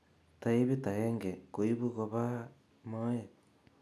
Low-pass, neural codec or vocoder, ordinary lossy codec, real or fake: none; none; none; real